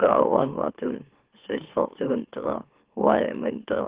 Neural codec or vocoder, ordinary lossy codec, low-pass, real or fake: autoencoder, 44.1 kHz, a latent of 192 numbers a frame, MeloTTS; Opus, 16 kbps; 3.6 kHz; fake